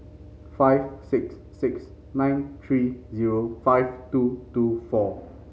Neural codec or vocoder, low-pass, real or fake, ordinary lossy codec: none; none; real; none